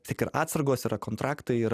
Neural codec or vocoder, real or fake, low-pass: none; real; 14.4 kHz